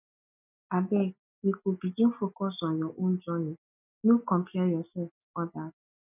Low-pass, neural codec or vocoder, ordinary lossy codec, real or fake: 3.6 kHz; none; none; real